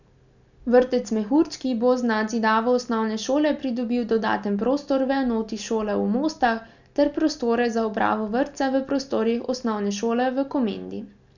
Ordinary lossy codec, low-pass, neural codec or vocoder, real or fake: none; 7.2 kHz; none; real